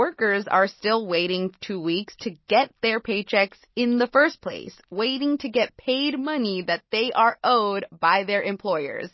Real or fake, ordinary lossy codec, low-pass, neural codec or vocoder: real; MP3, 24 kbps; 7.2 kHz; none